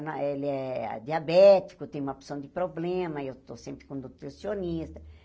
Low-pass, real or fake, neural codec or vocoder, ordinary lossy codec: none; real; none; none